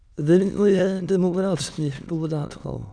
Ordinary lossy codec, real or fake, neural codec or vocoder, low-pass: none; fake; autoencoder, 22.05 kHz, a latent of 192 numbers a frame, VITS, trained on many speakers; 9.9 kHz